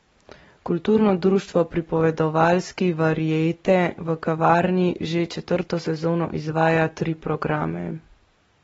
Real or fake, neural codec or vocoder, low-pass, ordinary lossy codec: real; none; 19.8 kHz; AAC, 24 kbps